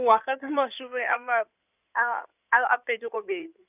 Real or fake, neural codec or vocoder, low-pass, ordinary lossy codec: fake; codec, 16 kHz in and 24 kHz out, 2.2 kbps, FireRedTTS-2 codec; 3.6 kHz; none